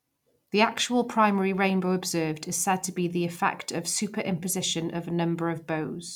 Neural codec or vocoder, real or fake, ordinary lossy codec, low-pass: none; real; none; 19.8 kHz